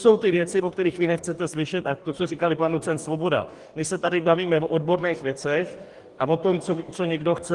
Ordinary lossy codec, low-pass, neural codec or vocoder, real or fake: Opus, 24 kbps; 10.8 kHz; codec, 44.1 kHz, 2.6 kbps, DAC; fake